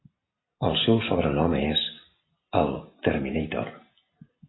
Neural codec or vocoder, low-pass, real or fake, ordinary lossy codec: none; 7.2 kHz; real; AAC, 16 kbps